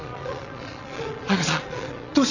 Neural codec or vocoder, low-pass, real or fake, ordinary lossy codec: vocoder, 22.05 kHz, 80 mel bands, Vocos; 7.2 kHz; fake; none